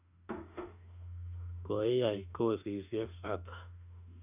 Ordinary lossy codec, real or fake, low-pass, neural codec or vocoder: none; fake; 3.6 kHz; autoencoder, 48 kHz, 32 numbers a frame, DAC-VAE, trained on Japanese speech